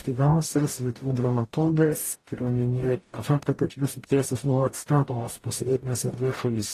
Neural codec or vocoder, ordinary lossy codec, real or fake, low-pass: codec, 44.1 kHz, 0.9 kbps, DAC; AAC, 48 kbps; fake; 14.4 kHz